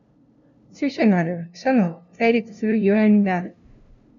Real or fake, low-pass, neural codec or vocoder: fake; 7.2 kHz; codec, 16 kHz, 0.5 kbps, FunCodec, trained on LibriTTS, 25 frames a second